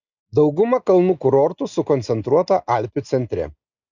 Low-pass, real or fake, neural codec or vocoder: 7.2 kHz; real; none